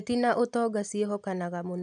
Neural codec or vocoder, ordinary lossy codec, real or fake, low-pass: none; none; real; 9.9 kHz